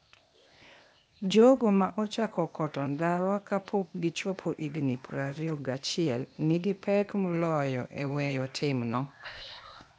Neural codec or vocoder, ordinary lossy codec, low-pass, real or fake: codec, 16 kHz, 0.8 kbps, ZipCodec; none; none; fake